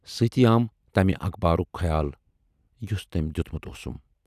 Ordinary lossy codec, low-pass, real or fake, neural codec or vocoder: none; 14.4 kHz; real; none